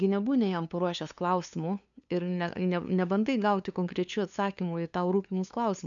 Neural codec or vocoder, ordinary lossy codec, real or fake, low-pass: codec, 16 kHz, 2 kbps, FunCodec, trained on LibriTTS, 25 frames a second; MP3, 96 kbps; fake; 7.2 kHz